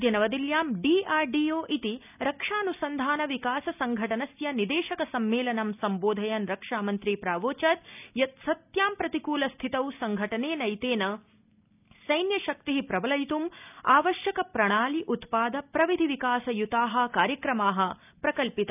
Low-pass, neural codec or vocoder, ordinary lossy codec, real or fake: 3.6 kHz; none; none; real